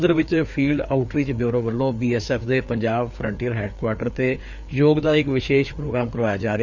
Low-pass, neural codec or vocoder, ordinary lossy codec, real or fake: 7.2 kHz; codec, 16 kHz, 4 kbps, FreqCodec, larger model; none; fake